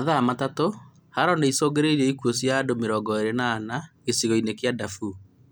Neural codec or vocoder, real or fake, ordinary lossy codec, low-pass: none; real; none; none